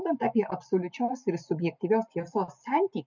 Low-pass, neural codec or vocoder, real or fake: 7.2 kHz; none; real